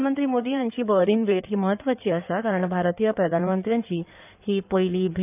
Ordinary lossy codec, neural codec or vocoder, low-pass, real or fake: none; codec, 16 kHz in and 24 kHz out, 2.2 kbps, FireRedTTS-2 codec; 3.6 kHz; fake